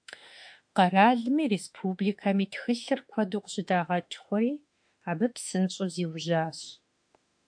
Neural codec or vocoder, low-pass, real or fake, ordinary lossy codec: autoencoder, 48 kHz, 32 numbers a frame, DAC-VAE, trained on Japanese speech; 9.9 kHz; fake; AAC, 64 kbps